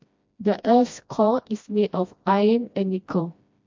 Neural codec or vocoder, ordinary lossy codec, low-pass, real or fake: codec, 16 kHz, 1 kbps, FreqCodec, smaller model; MP3, 48 kbps; 7.2 kHz; fake